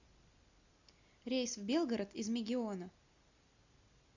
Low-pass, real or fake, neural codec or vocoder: 7.2 kHz; real; none